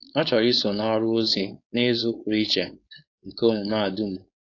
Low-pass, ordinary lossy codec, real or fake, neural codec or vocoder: 7.2 kHz; AAC, 48 kbps; fake; codec, 16 kHz, 4.8 kbps, FACodec